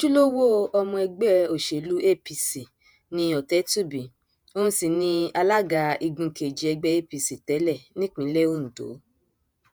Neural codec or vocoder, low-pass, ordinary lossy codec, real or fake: vocoder, 48 kHz, 128 mel bands, Vocos; none; none; fake